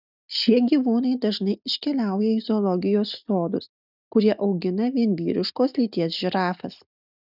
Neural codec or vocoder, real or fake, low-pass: none; real; 5.4 kHz